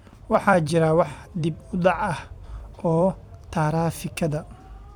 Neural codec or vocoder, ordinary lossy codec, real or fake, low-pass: vocoder, 44.1 kHz, 128 mel bands every 256 samples, BigVGAN v2; none; fake; 19.8 kHz